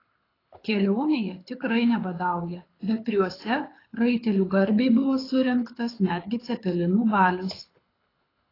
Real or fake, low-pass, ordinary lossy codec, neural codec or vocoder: fake; 5.4 kHz; AAC, 24 kbps; codec, 24 kHz, 6 kbps, HILCodec